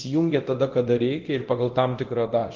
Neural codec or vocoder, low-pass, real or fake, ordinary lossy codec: codec, 24 kHz, 0.9 kbps, DualCodec; 7.2 kHz; fake; Opus, 16 kbps